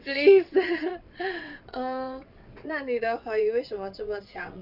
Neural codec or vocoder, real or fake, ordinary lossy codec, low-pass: vocoder, 22.05 kHz, 80 mel bands, WaveNeXt; fake; none; 5.4 kHz